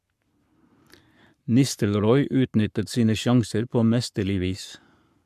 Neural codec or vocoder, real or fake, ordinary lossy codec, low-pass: codec, 44.1 kHz, 7.8 kbps, Pupu-Codec; fake; MP3, 96 kbps; 14.4 kHz